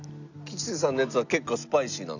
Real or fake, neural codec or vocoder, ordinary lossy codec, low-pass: real; none; none; 7.2 kHz